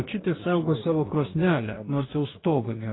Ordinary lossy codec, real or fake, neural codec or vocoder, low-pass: AAC, 16 kbps; fake; codec, 44.1 kHz, 2.6 kbps, DAC; 7.2 kHz